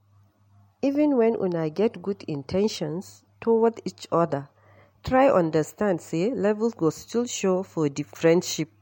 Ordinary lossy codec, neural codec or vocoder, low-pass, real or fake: MP3, 64 kbps; none; 19.8 kHz; real